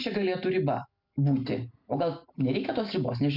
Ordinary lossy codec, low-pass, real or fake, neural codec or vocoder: MP3, 48 kbps; 5.4 kHz; real; none